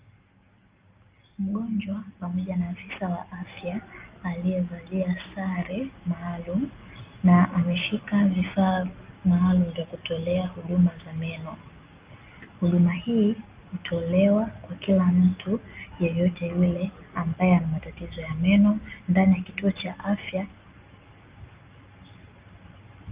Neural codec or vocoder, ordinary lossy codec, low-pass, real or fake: none; Opus, 24 kbps; 3.6 kHz; real